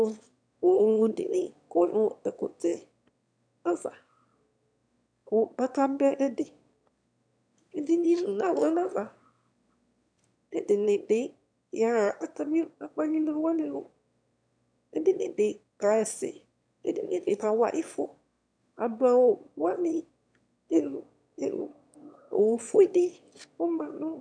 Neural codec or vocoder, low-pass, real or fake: autoencoder, 22.05 kHz, a latent of 192 numbers a frame, VITS, trained on one speaker; 9.9 kHz; fake